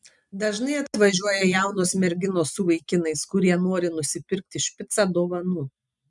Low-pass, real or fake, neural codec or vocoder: 10.8 kHz; real; none